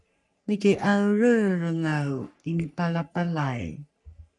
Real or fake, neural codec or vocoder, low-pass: fake; codec, 44.1 kHz, 3.4 kbps, Pupu-Codec; 10.8 kHz